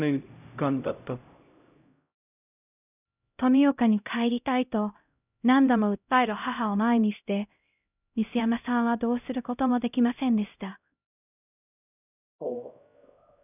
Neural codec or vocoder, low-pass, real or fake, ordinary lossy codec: codec, 16 kHz, 0.5 kbps, X-Codec, HuBERT features, trained on LibriSpeech; 3.6 kHz; fake; none